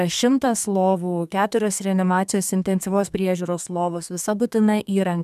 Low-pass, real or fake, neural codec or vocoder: 14.4 kHz; fake; codec, 32 kHz, 1.9 kbps, SNAC